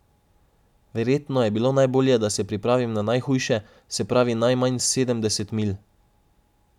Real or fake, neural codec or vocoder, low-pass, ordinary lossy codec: real; none; 19.8 kHz; none